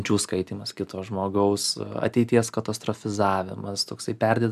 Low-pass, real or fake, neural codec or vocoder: 14.4 kHz; real; none